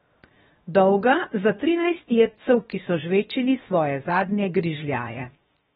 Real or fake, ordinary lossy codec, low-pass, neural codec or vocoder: fake; AAC, 16 kbps; 7.2 kHz; codec, 16 kHz, 0.7 kbps, FocalCodec